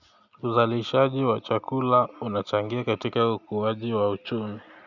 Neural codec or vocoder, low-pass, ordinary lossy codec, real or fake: none; 7.2 kHz; none; real